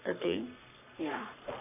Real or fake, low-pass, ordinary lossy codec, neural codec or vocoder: fake; 3.6 kHz; none; codec, 44.1 kHz, 3.4 kbps, Pupu-Codec